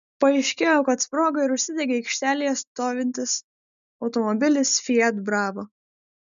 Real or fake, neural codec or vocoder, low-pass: real; none; 7.2 kHz